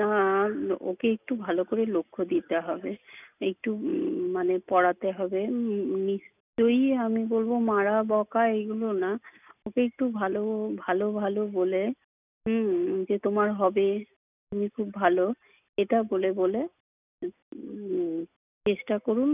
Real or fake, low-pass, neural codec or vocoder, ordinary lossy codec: real; 3.6 kHz; none; none